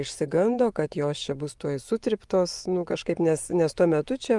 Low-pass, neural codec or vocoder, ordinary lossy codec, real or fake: 10.8 kHz; vocoder, 24 kHz, 100 mel bands, Vocos; Opus, 32 kbps; fake